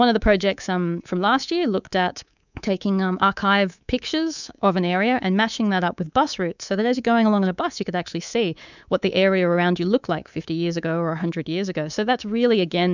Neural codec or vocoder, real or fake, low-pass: codec, 24 kHz, 3.1 kbps, DualCodec; fake; 7.2 kHz